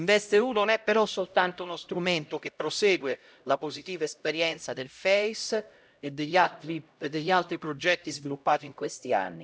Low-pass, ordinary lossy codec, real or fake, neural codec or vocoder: none; none; fake; codec, 16 kHz, 0.5 kbps, X-Codec, HuBERT features, trained on LibriSpeech